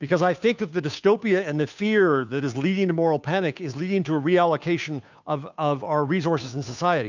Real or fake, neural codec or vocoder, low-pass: fake; codec, 16 kHz, 2 kbps, FunCodec, trained on Chinese and English, 25 frames a second; 7.2 kHz